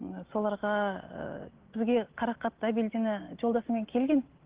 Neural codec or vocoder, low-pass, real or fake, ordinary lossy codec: none; 3.6 kHz; real; Opus, 16 kbps